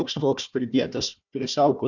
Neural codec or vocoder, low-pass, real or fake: codec, 16 kHz, 1 kbps, FunCodec, trained on Chinese and English, 50 frames a second; 7.2 kHz; fake